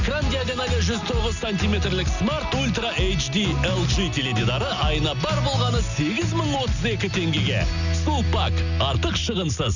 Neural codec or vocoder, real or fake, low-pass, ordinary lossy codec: none; real; 7.2 kHz; none